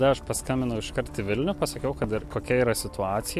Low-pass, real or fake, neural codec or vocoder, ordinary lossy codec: 14.4 kHz; real; none; MP3, 64 kbps